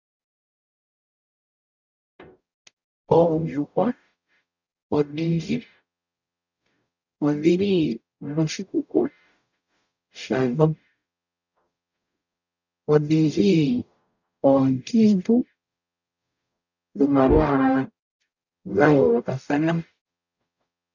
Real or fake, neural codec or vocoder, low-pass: fake; codec, 44.1 kHz, 0.9 kbps, DAC; 7.2 kHz